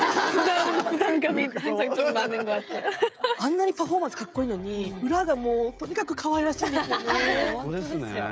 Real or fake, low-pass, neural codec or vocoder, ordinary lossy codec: fake; none; codec, 16 kHz, 16 kbps, FreqCodec, smaller model; none